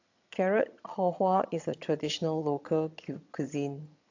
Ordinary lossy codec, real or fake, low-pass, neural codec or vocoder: AAC, 48 kbps; fake; 7.2 kHz; vocoder, 22.05 kHz, 80 mel bands, HiFi-GAN